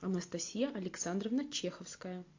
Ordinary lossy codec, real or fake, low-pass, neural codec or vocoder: AAC, 48 kbps; real; 7.2 kHz; none